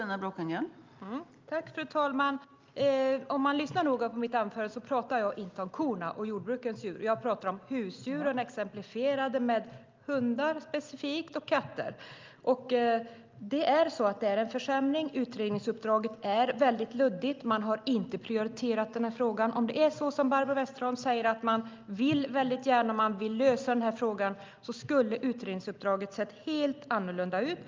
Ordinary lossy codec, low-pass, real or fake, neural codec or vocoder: Opus, 24 kbps; 7.2 kHz; real; none